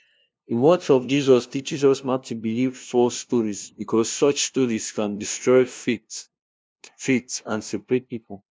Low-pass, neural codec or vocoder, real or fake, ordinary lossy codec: none; codec, 16 kHz, 0.5 kbps, FunCodec, trained on LibriTTS, 25 frames a second; fake; none